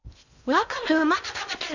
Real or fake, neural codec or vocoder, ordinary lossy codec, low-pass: fake; codec, 16 kHz in and 24 kHz out, 0.6 kbps, FocalCodec, streaming, 2048 codes; none; 7.2 kHz